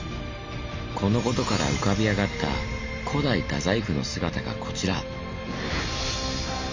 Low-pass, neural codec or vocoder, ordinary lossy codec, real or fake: 7.2 kHz; none; MP3, 64 kbps; real